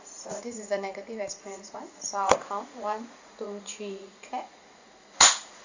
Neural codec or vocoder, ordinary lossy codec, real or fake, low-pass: vocoder, 44.1 kHz, 80 mel bands, Vocos; Opus, 64 kbps; fake; 7.2 kHz